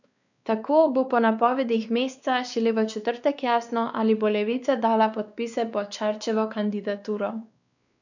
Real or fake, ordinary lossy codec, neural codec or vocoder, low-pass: fake; none; codec, 16 kHz, 2 kbps, X-Codec, WavLM features, trained on Multilingual LibriSpeech; 7.2 kHz